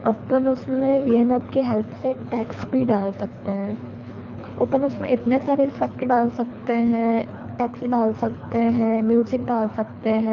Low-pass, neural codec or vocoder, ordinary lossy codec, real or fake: 7.2 kHz; codec, 24 kHz, 3 kbps, HILCodec; none; fake